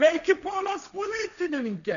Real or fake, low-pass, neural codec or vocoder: fake; 7.2 kHz; codec, 16 kHz, 1.1 kbps, Voila-Tokenizer